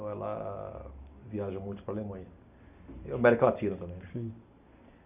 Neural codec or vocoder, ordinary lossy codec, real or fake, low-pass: none; none; real; 3.6 kHz